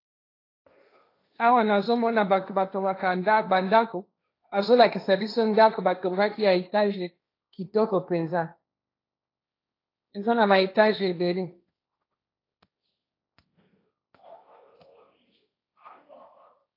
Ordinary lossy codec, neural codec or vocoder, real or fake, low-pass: AAC, 32 kbps; codec, 16 kHz, 1.1 kbps, Voila-Tokenizer; fake; 5.4 kHz